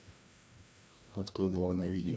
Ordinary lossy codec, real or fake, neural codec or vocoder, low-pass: none; fake; codec, 16 kHz, 1 kbps, FreqCodec, larger model; none